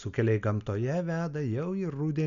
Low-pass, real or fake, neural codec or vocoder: 7.2 kHz; real; none